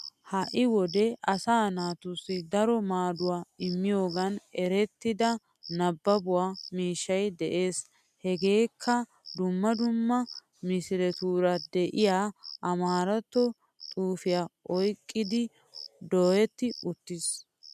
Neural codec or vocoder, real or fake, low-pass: none; real; 14.4 kHz